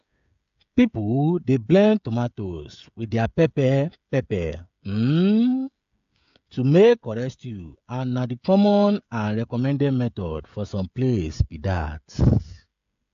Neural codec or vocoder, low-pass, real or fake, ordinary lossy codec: codec, 16 kHz, 16 kbps, FreqCodec, smaller model; 7.2 kHz; fake; AAC, 64 kbps